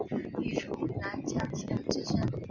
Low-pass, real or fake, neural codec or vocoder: 7.2 kHz; real; none